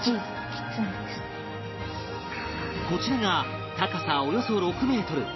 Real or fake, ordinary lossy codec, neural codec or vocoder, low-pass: real; MP3, 24 kbps; none; 7.2 kHz